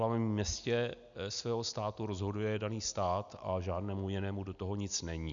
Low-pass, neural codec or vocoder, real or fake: 7.2 kHz; none; real